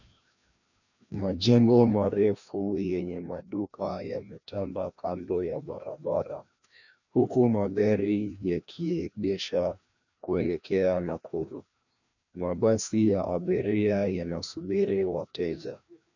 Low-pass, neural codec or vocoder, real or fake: 7.2 kHz; codec, 16 kHz, 1 kbps, FreqCodec, larger model; fake